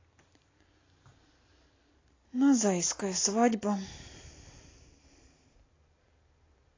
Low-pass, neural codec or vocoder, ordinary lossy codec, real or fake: 7.2 kHz; none; AAC, 32 kbps; real